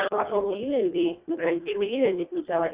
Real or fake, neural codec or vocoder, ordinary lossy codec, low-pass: fake; codec, 24 kHz, 1.5 kbps, HILCodec; Opus, 64 kbps; 3.6 kHz